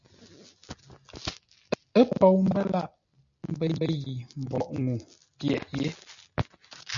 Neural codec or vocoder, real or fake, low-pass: none; real; 7.2 kHz